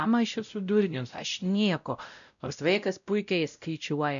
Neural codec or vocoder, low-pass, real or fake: codec, 16 kHz, 0.5 kbps, X-Codec, WavLM features, trained on Multilingual LibriSpeech; 7.2 kHz; fake